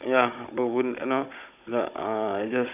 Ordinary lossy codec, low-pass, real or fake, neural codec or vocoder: none; 3.6 kHz; fake; codec, 16 kHz, 16 kbps, FunCodec, trained on Chinese and English, 50 frames a second